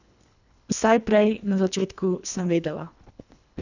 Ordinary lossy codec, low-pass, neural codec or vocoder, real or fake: none; 7.2 kHz; codec, 24 kHz, 1.5 kbps, HILCodec; fake